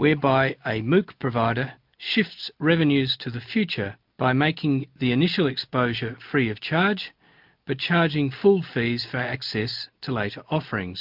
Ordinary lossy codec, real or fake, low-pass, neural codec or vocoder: MP3, 48 kbps; real; 5.4 kHz; none